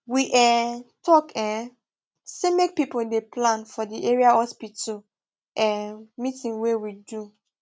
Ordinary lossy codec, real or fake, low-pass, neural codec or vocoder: none; real; none; none